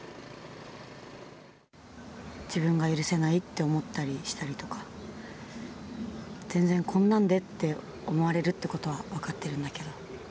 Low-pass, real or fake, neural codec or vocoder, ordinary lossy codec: none; real; none; none